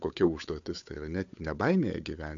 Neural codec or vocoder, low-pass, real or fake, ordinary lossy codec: codec, 16 kHz, 8 kbps, FunCodec, trained on Chinese and English, 25 frames a second; 7.2 kHz; fake; AAC, 64 kbps